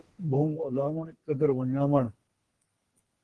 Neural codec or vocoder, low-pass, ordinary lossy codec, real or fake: codec, 32 kHz, 1.9 kbps, SNAC; 10.8 kHz; Opus, 16 kbps; fake